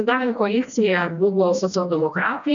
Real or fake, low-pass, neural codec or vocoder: fake; 7.2 kHz; codec, 16 kHz, 1 kbps, FreqCodec, smaller model